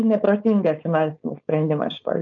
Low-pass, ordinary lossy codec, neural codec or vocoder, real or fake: 7.2 kHz; MP3, 48 kbps; codec, 16 kHz, 4.8 kbps, FACodec; fake